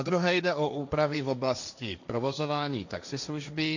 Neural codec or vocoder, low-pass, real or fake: codec, 16 kHz, 1.1 kbps, Voila-Tokenizer; 7.2 kHz; fake